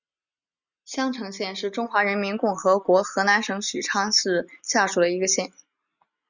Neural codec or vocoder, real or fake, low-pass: none; real; 7.2 kHz